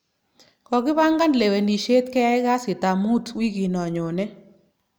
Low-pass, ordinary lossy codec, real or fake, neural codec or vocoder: none; none; real; none